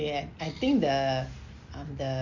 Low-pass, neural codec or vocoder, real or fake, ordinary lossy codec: 7.2 kHz; none; real; Opus, 64 kbps